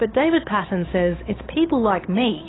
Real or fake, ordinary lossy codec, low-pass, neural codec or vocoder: fake; AAC, 16 kbps; 7.2 kHz; codec, 16 kHz, 8 kbps, FunCodec, trained on Chinese and English, 25 frames a second